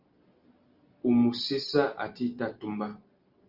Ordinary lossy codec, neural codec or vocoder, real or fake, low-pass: Opus, 32 kbps; none; real; 5.4 kHz